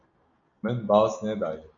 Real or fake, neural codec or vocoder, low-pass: real; none; 7.2 kHz